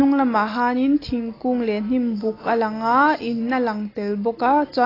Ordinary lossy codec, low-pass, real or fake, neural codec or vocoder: AAC, 24 kbps; 5.4 kHz; real; none